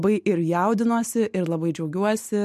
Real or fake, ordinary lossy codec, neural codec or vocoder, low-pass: real; MP3, 64 kbps; none; 14.4 kHz